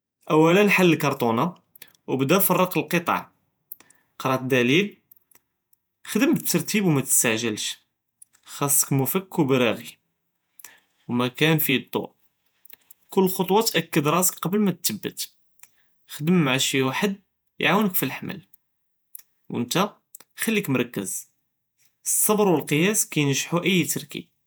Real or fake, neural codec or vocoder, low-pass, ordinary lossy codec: fake; vocoder, 48 kHz, 128 mel bands, Vocos; none; none